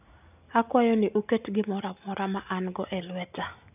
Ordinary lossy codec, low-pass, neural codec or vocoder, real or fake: none; 3.6 kHz; none; real